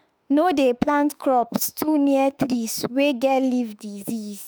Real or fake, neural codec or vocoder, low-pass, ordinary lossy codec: fake; autoencoder, 48 kHz, 32 numbers a frame, DAC-VAE, trained on Japanese speech; none; none